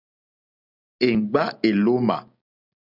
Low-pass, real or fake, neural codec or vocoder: 5.4 kHz; real; none